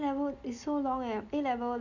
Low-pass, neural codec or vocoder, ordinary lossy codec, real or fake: 7.2 kHz; none; none; real